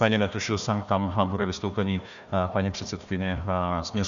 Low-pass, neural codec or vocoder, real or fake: 7.2 kHz; codec, 16 kHz, 1 kbps, FunCodec, trained on Chinese and English, 50 frames a second; fake